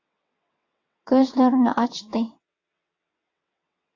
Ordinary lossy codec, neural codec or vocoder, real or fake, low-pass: AAC, 48 kbps; vocoder, 22.05 kHz, 80 mel bands, WaveNeXt; fake; 7.2 kHz